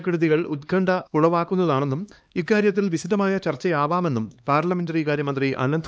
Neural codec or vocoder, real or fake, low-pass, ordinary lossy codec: codec, 16 kHz, 2 kbps, X-Codec, HuBERT features, trained on LibriSpeech; fake; none; none